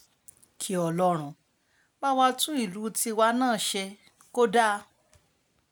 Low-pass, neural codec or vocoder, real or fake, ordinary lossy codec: none; none; real; none